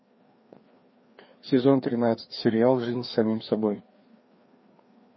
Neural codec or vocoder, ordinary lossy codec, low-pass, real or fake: codec, 16 kHz, 2 kbps, FreqCodec, larger model; MP3, 24 kbps; 7.2 kHz; fake